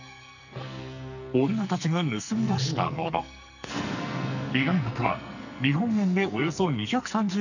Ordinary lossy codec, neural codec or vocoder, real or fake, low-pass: none; codec, 44.1 kHz, 2.6 kbps, SNAC; fake; 7.2 kHz